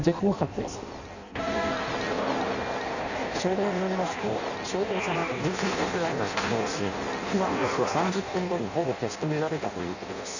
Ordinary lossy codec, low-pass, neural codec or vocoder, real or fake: none; 7.2 kHz; codec, 16 kHz in and 24 kHz out, 0.6 kbps, FireRedTTS-2 codec; fake